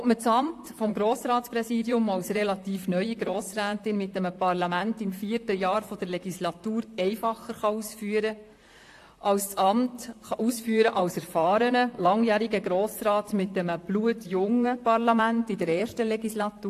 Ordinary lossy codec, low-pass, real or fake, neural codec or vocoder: AAC, 64 kbps; 14.4 kHz; fake; vocoder, 44.1 kHz, 128 mel bands, Pupu-Vocoder